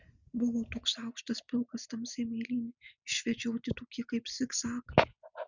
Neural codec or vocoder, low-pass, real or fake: none; 7.2 kHz; real